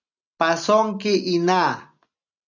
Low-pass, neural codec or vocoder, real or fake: 7.2 kHz; none; real